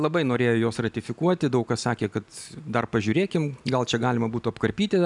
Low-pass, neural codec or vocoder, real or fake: 10.8 kHz; none; real